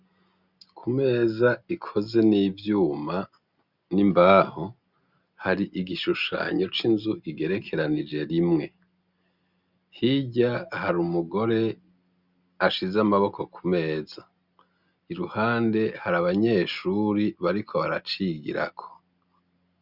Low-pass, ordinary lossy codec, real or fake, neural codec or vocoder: 5.4 kHz; Opus, 64 kbps; real; none